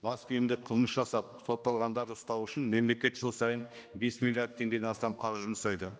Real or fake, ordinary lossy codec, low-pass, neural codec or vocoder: fake; none; none; codec, 16 kHz, 1 kbps, X-Codec, HuBERT features, trained on general audio